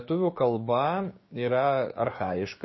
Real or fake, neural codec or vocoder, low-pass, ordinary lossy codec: fake; codec, 16 kHz, 6 kbps, DAC; 7.2 kHz; MP3, 24 kbps